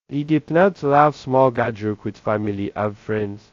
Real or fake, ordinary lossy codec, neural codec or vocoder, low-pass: fake; AAC, 48 kbps; codec, 16 kHz, 0.2 kbps, FocalCodec; 7.2 kHz